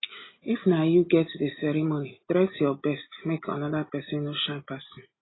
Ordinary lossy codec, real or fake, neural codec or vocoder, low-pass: AAC, 16 kbps; real; none; 7.2 kHz